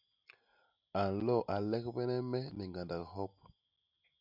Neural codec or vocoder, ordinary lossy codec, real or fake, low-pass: none; MP3, 48 kbps; real; 5.4 kHz